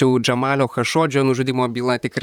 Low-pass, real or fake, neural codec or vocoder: 19.8 kHz; fake; vocoder, 44.1 kHz, 128 mel bands, Pupu-Vocoder